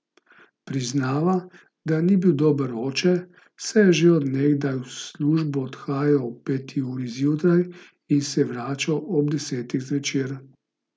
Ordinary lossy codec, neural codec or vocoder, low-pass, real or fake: none; none; none; real